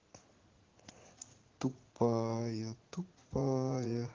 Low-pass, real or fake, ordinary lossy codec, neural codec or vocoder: 7.2 kHz; fake; Opus, 16 kbps; vocoder, 44.1 kHz, 80 mel bands, Vocos